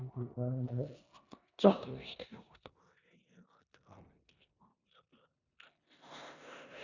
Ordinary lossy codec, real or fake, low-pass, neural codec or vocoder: Opus, 64 kbps; fake; 7.2 kHz; codec, 16 kHz in and 24 kHz out, 0.9 kbps, LongCat-Audio-Codec, four codebook decoder